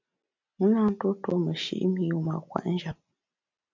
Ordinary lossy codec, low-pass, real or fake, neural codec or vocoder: MP3, 64 kbps; 7.2 kHz; real; none